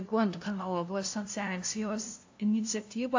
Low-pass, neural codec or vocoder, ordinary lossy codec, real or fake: 7.2 kHz; codec, 16 kHz, 0.5 kbps, FunCodec, trained on LibriTTS, 25 frames a second; AAC, 48 kbps; fake